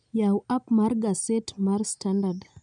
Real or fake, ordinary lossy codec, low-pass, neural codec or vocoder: real; none; 9.9 kHz; none